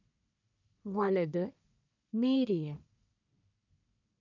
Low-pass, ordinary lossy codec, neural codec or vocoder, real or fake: 7.2 kHz; none; codec, 44.1 kHz, 1.7 kbps, Pupu-Codec; fake